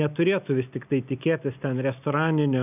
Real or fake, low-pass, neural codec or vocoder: real; 3.6 kHz; none